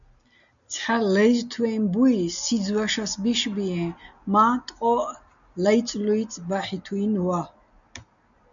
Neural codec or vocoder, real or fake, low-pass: none; real; 7.2 kHz